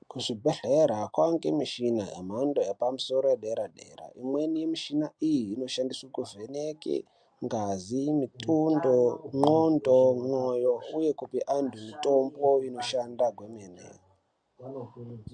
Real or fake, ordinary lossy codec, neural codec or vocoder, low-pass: real; MP3, 64 kbps; none; 9.9 kHz